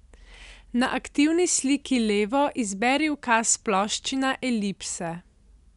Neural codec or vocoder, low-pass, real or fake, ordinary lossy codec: none; 10.8 kHz; real; none